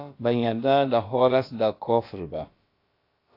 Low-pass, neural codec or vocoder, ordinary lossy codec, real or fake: 5.4 kHz; codec, 16 kHz, about 1 kbps, DyCAST, with the encoder's durations; AAC, 32 kbps; fake